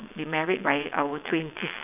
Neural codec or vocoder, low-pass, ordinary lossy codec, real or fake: vocoder, 22.05 kHz, 80 mel bands, WaveNeXt; 3.6 kHz; none; fake